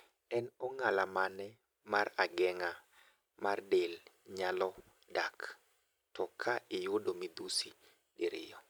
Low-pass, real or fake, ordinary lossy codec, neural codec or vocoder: none; fake; none; vocoder, 44.1 kHz, 128 mel bands every 256 samples, BigVGAN v2